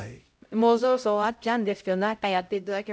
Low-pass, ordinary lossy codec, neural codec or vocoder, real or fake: none; none; codec, 16 kHz, 0.5 kbps, X-Codec, HuBERT features, trained on LibriSpeech; fake